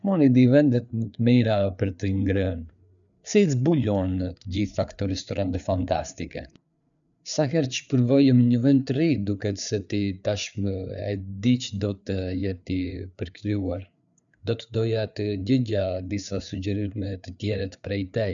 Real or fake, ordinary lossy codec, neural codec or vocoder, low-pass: fake; none; codec, 16 kHz, 4 kbps, FreqCodec, larger model; 7.2 kHz